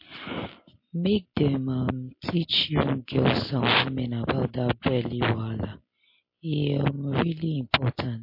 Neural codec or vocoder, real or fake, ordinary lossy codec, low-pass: none; real; MP3, 32 kbps; 5.4 kHz